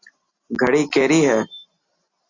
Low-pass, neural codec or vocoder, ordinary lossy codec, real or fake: 7.2 kHz; none; Opus, 64 kbps; real